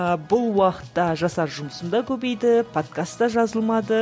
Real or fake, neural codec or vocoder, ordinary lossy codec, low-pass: real; none; none; none